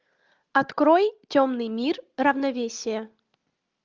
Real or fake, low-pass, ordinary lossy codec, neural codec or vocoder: real; 7.2 kHz; Opus, 24 kbps; none